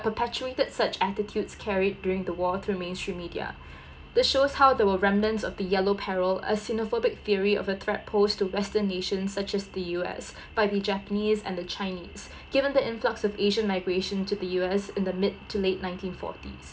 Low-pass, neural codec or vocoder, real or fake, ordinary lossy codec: none; none; real; none